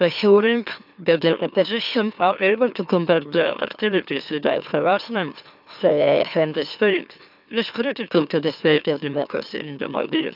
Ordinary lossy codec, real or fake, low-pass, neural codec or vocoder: none; fake; 5.4 kHz; autoencoder, 44.1 kHz, a latent of 192 numbers a frame, MeloTTS